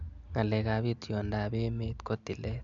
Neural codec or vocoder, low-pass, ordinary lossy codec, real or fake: none; 7.2 kHz; MP3, 96 kbps; real